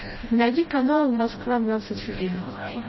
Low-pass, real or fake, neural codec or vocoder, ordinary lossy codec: 7.2 kHz; fake; codec, 16 kHz, 0.5 kbps, FreqCodec, smaller model; MP3, 24 kbps